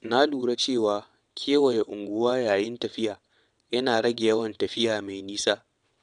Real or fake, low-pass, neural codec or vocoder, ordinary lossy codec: fake; 9.9 kHz; vocoder, 22.05 kHz, 80 mel bands, WaveNeXt; none